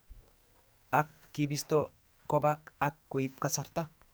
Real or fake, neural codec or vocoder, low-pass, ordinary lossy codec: fake; codec, 44.1 kHz, 2.6 kbps, SNAC; none; none